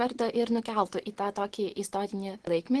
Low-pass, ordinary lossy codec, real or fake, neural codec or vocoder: 10.8 kHz; Opus, 16 kbps; real; none